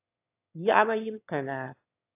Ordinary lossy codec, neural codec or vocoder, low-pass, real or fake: AAC, 24 kbps; autoencoder, 22.05 kHz, a latent of 192 numbers a frame, VITS, trained on one speaker; 3.6 kHz; fake